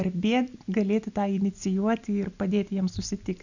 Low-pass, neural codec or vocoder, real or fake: 7.2 kHz; none; real